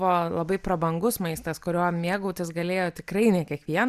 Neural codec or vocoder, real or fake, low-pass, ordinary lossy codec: none; real; 14.4 kHz; Opus, 64 kbps